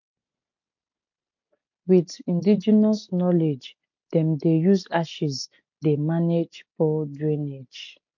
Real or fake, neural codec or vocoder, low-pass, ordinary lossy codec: real; none; 7.2 kHz; MP3, 48 kbps